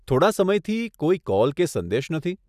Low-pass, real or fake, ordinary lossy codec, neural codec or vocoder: 14.4 kHz; real; none; none